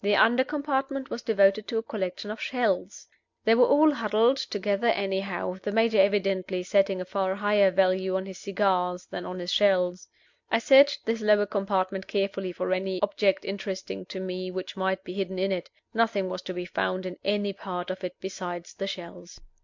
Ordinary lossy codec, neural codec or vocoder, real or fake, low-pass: MP3, 64 kbps; none; real; 7.2 kHz